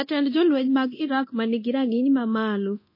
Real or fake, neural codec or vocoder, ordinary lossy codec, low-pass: fake; codec, 24 kHz, 0.9 kbps, DualCodec; MP3, 24 kbps; 5.4 kHz